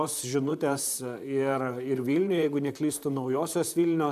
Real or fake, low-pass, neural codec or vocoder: fake; 14.4 kHz; vocoder, 44.1 kHz, 128 mel bands, Pupu-Vocoder